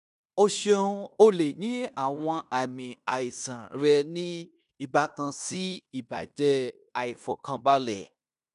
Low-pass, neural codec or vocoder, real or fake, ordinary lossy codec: 10.8 kHz; codec, 16 kHz in and 24 kHz out, 0.9 kbps, LongCat-Audio-Codec, fine tuned four codebook decoder; fake; none